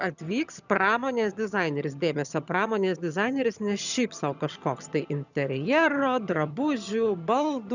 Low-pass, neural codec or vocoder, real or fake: 7.2 kHz; vocoder, 22.05 kHz, 80 mel bands, HiFi-GAN; fake